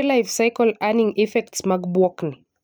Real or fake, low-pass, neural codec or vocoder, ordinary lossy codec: real; none; none; none